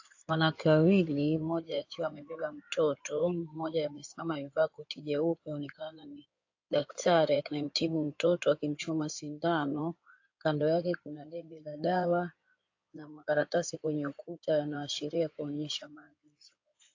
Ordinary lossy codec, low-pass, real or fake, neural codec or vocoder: AAC, 48 kbps; 7.2 kHz; fake; codec, 16 kHz in and 24 kHz out, 2.2 kbps, FireRedTTS-2 codec